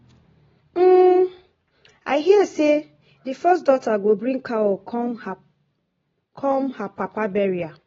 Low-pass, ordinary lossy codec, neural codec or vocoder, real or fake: 7.2 kHz; AAC, 24 kbps; none; real